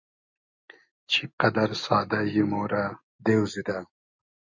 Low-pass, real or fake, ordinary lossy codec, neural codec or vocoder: 7.2 kHz; real; MP3, 48 kbps; none